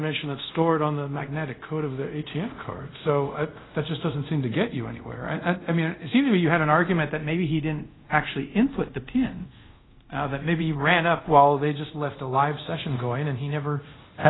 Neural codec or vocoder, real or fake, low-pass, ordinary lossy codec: codec, 24 kHz, 0.5 kbps, DualCodec; fake; 7.2 kHz; AAC, 16 kbps